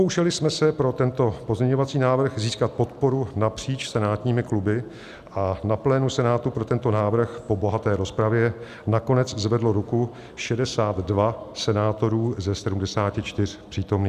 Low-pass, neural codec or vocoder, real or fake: 14.4 kHz; none; real